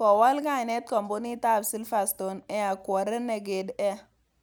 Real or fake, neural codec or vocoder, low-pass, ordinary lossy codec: real; none; none; none